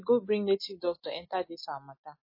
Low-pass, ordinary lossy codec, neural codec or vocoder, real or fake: 5.4 kHz; MP3, 24 kbps; none; real